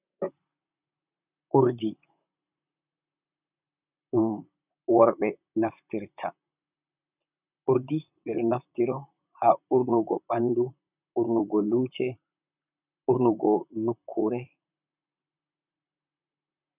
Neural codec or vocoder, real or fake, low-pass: vocoder, 44.1 kHz, 128 mel bands, Pupu-Vocoder; fake; 3.6 kHz